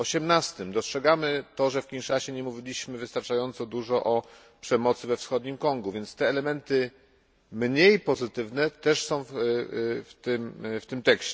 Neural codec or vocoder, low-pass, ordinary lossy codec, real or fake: none; none; none; real